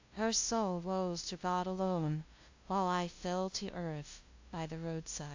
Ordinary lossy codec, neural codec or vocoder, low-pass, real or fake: AAC, 48 kbps; codec, 16 kHz, 0.5 kbps, FunCodec, trained on LibriTTS, 25 frames a second; 7.2 kHz; fake